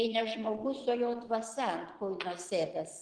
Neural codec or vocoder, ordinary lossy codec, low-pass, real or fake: vocoder, 22.05 kHz, 80 mel bands, Vocos; Opus, 16 kbps; 9.9 kHz; fake